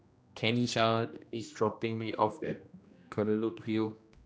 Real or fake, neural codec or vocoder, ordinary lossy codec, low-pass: fake; codec, 16 kHz, 1 kbps, X-Codec, HuBERT features, trained on general audio; none; none